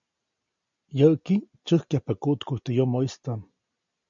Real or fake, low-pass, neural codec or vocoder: real; 7.2 kHz; none